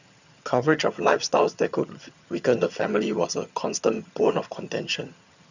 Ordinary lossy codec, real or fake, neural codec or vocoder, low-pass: none; fake; vocoder, 22.05 kHz, 80 mel bands, HiFi-GAN; 7.2 kHz